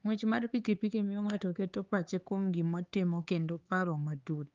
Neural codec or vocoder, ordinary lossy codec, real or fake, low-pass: codec, 16 kHz, 4 kbps, X-Codec, HuBERT features, trained on LibriSpeech; Opus, 32 kbps; fake; 7.2 kHz